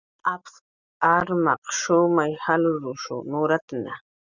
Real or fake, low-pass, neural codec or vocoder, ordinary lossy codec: real; 7.2 kHz; none; Opus, 64 kbps